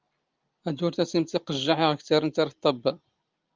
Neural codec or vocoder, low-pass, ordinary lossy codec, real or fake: none; 7.2 kHz; Opus, 24 kbps; real